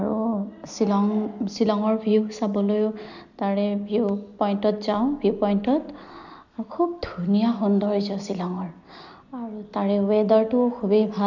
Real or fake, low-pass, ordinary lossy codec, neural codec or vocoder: real; 7.2 kHz; none; none